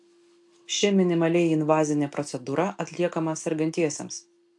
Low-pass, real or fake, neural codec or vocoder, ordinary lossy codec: 10.8 kHz; real; none; AAC, 64 kbps